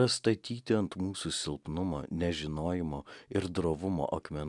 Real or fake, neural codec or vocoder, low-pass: real; none; 10.8 kHz